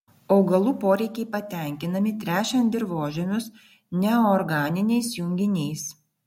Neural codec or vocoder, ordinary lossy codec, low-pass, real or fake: none; MP3, 64 kbps; 19.8 kHz; real